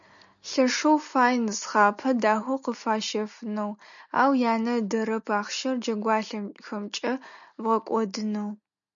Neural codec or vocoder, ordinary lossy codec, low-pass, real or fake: none; MP3, 96 kbps; 7.2 kHz; real